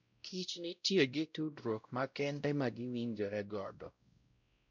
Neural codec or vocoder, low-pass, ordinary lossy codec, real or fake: codec, 16 kHz, 0.5 kbps, X-Codec, WavLM features, trained on Multilingual LibriSpeech; 7.2 kHz; none; fake